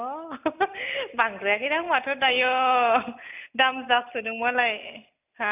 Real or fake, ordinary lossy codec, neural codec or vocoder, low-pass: real; none; none; 3.6 kHz